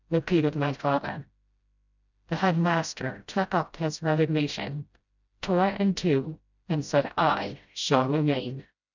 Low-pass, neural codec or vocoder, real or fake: 7.2 kHz; codec, 16 kHz, 0.5 kbps, FreqCodec, smaller model; fake